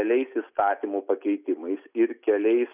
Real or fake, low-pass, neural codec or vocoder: real; 3.6 kHz; none